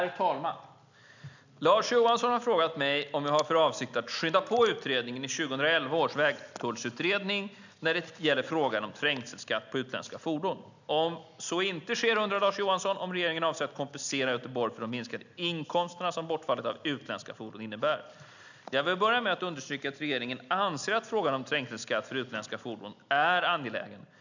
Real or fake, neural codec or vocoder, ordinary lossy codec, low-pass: real; none; none; 7.2 kHz